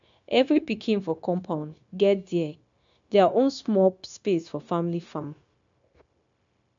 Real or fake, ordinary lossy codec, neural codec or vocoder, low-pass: fake; MP3, 64 kbps; codec, 16 kHz, 0.9 kbps, LongCat-Audio-Codec; 7.2 kHz